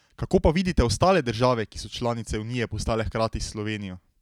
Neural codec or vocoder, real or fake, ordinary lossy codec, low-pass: none; real; none; 19.8 kHz